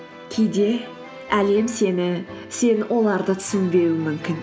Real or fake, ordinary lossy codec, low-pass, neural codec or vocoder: real; none; none; none